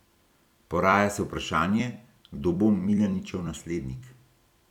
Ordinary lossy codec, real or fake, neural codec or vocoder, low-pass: none; fake; vocoder, 44.1 kHz, 128 mel bands every 256 samples, BigVGAN v2; 19.8 kHz